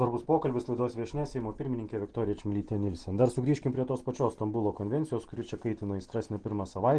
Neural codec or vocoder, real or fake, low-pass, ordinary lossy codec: none; real; 9.9 kHz; Opus, 16 kbps